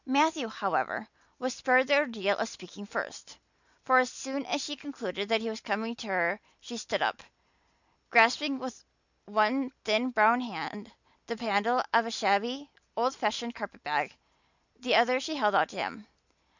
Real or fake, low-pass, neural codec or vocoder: real; 7.2 kHz; none